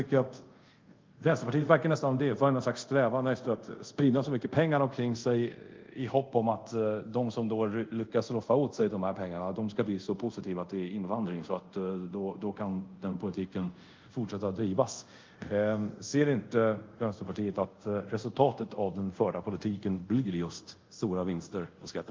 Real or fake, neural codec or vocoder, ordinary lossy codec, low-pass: fake; codec, 24 kHz, 0.5 kbps, DualCodec; Opus, 24 kbps; 7.2 kHz